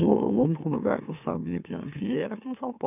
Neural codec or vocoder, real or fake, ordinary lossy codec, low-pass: autoencoder, 44.1 kHz, a latent of 192 numbers a frame, MeloTTS; fake; none; 3.6 kHz